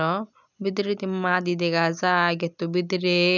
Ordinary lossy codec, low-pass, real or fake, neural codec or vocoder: none; 7.2 kHz; real; none